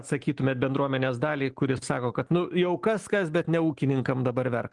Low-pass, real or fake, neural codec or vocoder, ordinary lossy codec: 10.8 kHz; real; none; Opus, 24 kbps